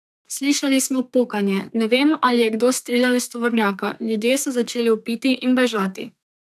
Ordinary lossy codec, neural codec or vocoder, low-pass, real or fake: none; codec, 32 kHz, 1.9 kbps, SNAC; 14.4 kHz; fake